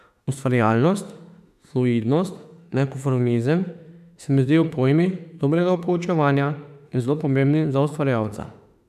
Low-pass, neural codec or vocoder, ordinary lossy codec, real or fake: 14.4 kHz; autoencoder, 48 kHz, 32 numbers a frame, DAC-VAE, trained on Japanese speech; none; fake